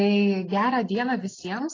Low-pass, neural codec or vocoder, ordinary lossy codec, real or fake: 7.2 kHz; none; AAC, 32 kbps; real